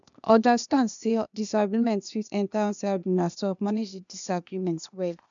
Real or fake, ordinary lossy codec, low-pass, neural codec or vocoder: fake; none; 7.2 kHz; codec, 16 kHz, 0.8 kbps, ZipCodec